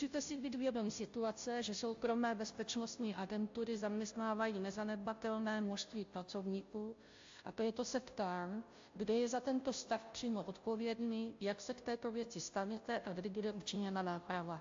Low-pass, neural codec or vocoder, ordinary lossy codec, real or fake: 7.2 kHz; codec, 16 kHz, 0.5 kbps, FunCodec, trained on Chinese and English, 25 frames a second; AAC, 48 kbps; fake